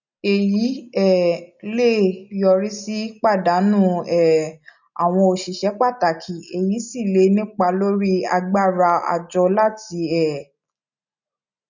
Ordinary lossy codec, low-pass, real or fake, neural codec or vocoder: none; 7.2 kHz; real; none